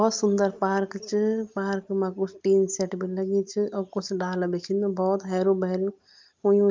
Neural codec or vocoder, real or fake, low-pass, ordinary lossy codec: none; real; 7.2 kHz; Opus, 24 kbps